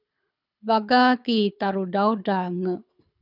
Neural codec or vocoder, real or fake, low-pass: codec, 24 kHz, 6 kbps, HILCodec; fake; 5.4 kHz